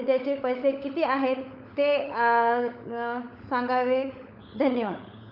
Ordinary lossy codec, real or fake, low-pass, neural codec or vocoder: none; fake; 5.4 kHz; codec, 16 kHz, 16 kbps, FunCodec, trained on LibriTTS, 50 frames a second